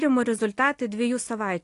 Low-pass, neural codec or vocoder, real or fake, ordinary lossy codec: 10.8 kHz; none; real; AAC, 48 kbps